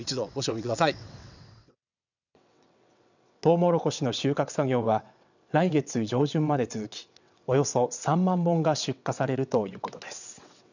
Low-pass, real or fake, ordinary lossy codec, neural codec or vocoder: 7.2 kHz; fake; none; vocoder, 22.05 kHz, 80 mel bands, WaveNeXt